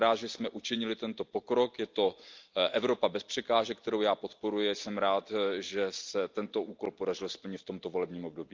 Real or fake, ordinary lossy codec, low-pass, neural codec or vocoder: real; Opus, 24 kbps; 7.2 kHz; none